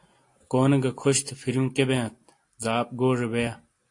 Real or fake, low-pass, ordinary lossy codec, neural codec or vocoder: real; 10.8 kHz; AAC, 48 kbps; none